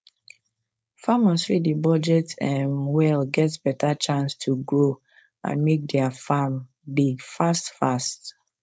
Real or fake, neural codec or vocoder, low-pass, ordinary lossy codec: fake; codec, 16 kHz, 4.8 kbps, FACodec; none; none